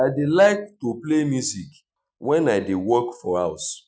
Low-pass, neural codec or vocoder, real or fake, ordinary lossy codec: none; none; real; none